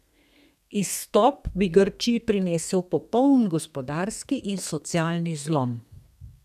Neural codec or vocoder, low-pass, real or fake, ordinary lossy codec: codec, 32 kHz, 1.9 kbps, SNAC; 14.4 kHz; fake; none